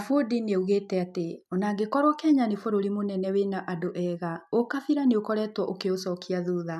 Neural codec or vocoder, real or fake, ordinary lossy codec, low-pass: none; real; none; 14.4 kHz